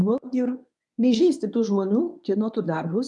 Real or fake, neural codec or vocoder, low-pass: fake; codec, 24 kHz, 0.9 kbps, WavTokenizer, medium speech release version 1; 10.8 kHz